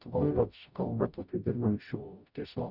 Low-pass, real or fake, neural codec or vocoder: 5.4 kHz; fake; codec, 44.1 kHz, 0.9 kbps, DAC